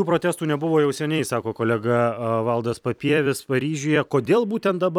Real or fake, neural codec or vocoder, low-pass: fake; vocoder, 44.1 kHz, 128 mel bands every 256 samples, BigVGAN v2; 19.8 kHz